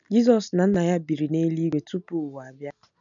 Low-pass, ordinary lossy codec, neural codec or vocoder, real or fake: 7.2 kHz; none; none; real